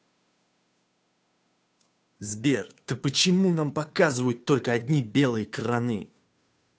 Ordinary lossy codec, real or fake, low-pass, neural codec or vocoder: none; fake; none; codec, 16 kHz, 2 kbps, FunCodec, trained on Chinese and English, 25 frames a second